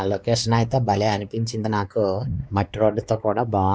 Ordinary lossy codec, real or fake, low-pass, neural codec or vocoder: none; fake; none; codec, 16 kHz, 2 kbps, X-Codec, WavLM features, trained on Multilingual LibriSpeech